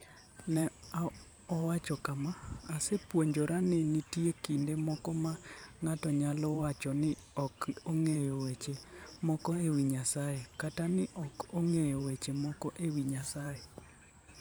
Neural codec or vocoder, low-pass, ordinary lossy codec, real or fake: vocoder, 44.1 kHz, 128 mel bands every 512 samples, BigVGAN v2; none; none; fake